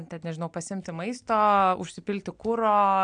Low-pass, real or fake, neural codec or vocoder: 9.9 kHz; real; none